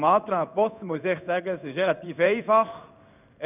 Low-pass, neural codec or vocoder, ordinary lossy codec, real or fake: 3.6 kHz; codec, 16 kHz in and 24 kHz out, 1 kbps, XY-Tokenizer; none; fake